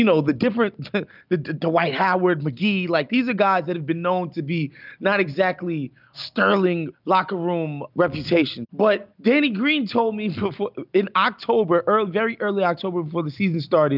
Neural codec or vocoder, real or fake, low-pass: none; real; 5.4 kHz